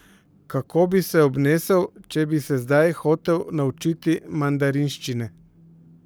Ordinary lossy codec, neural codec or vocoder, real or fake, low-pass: none; codec, 44.1 kHz, 7.8 kbps, Pupu-Codec; fake; none